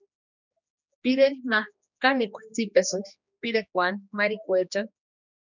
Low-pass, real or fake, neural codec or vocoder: 7.2 kHz; fake; codec, 16 kHz, 2 kbps, X-Codec, HuBERT features, trained on general audio